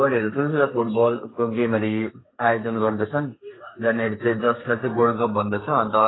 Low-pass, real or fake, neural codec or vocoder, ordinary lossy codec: 7.2 kHz; fake; codec, 32 kHz, 1.9 kbps, SNAC; AAC, 16 kbps